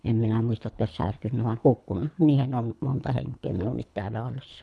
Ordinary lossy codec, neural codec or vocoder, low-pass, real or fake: none; codec, 24 kHz, 3 kbps, HILCodec; none; fake